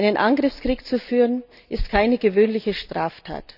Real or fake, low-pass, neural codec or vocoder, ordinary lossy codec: real; 5.4 kHz; none; none